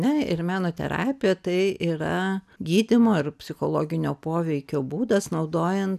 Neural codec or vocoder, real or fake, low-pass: none; real; 14.4 kHz